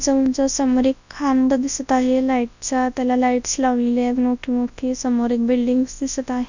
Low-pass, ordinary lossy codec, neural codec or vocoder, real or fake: 7.2 kHz; none; codec, 24 kHz, 0.9 kbps, WavTokenizer, large speech release; fake